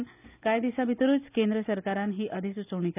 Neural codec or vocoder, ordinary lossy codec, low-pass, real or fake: none; none; 3.6 kHz; real